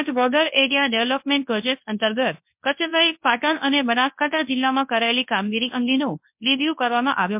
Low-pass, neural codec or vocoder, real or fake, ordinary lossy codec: 3.6 kHz; codec, 24 kHz, 0.9 kbps, WavTokenizer, large speech release; fake; MP3, 32 kbps